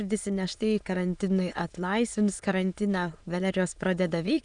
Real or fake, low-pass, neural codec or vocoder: fake; 9.9 kHz; autoencoder, 22.05 kHz, a latent of 192 numbers a frame, VITS, trained on many speakers